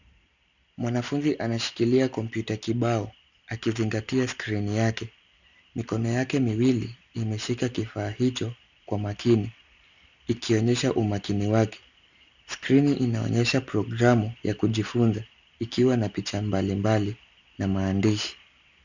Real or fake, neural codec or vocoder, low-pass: real; none; 7.2 kHz